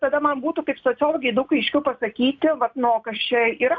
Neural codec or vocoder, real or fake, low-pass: none; real; 7.2 kHz